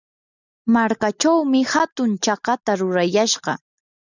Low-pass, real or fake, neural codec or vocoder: 7.2 kHz; real; none